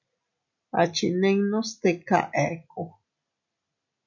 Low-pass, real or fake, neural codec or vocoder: 7.2 kHz; real; none